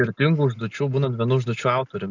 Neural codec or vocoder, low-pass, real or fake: none; 7.2 kHz; real